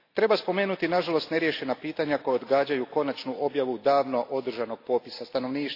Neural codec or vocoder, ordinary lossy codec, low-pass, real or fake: none; AAC, 32 kbps; 5.4 kHz; real